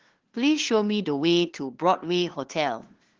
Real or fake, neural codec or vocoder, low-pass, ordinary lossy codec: fake; codec, 16 kHz, 2 kbps, FunCodec, trained on LibriTTS, 25 frames a second; 7.2 kHz; Opus, 16 kbps